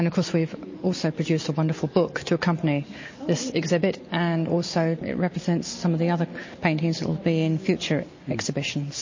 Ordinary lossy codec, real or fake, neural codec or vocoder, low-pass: MP3, 32 kbps; real; none; 7.2 kHz